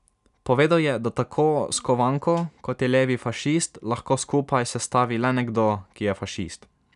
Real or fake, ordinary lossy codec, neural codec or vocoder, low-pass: real; none; none; 10.8 kHz